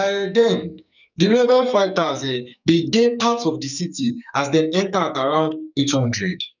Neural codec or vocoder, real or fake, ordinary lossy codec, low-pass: codec, 44.1 kHz, 2.6 kbps, SNAC; fake; none; 7.2 kHz